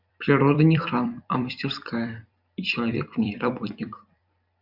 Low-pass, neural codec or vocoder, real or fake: 5.4 kHz; vocoder, 44.1 kHz, 128 mel bands every 256 samples, BigVGAN v2; fake